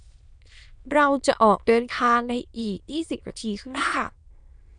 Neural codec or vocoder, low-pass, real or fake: autoencoder, 22.05 kHz, a latent of 192 numbers a frame, VITS, trained on many speakers; 9.9 kHz; fake